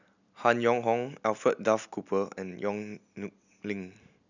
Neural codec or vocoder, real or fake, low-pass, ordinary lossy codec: none; real; 7.2 kHz; none